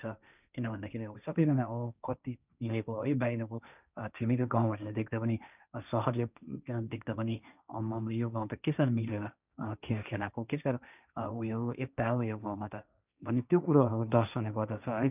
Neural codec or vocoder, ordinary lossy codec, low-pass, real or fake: codec, 16 kHz, 1.1 kbps, Voila-Tokenizer; none; 3.6 kHz; fake